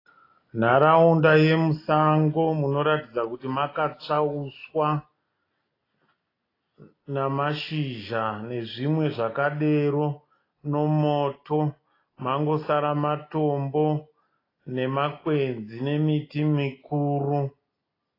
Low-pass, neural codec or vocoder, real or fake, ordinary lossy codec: 5.4 kHz; none; real; AAC, 24 kbps